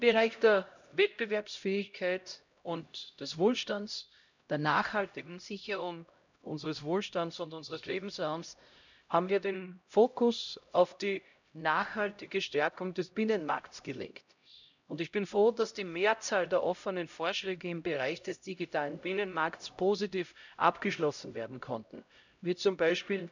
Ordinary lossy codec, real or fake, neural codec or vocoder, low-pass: none; fake; codec, 16 kHz, 0.5 kbps, X-Codec, HuBERT features, trained on LibriSpeech; 7.2 kHz